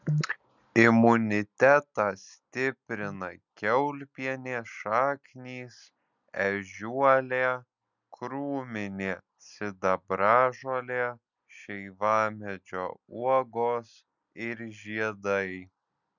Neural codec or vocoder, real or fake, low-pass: none; real; 7.2 kHz